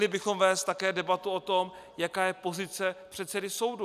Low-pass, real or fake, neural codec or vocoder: 14.4 kHz; real; none